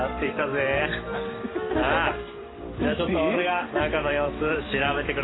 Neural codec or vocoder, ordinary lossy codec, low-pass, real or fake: vocoder, 44.1 kHz, 128 mel bands every 256 samples, BigVGAN v2; AAC, 16 kbps; 7.2 kHz; fake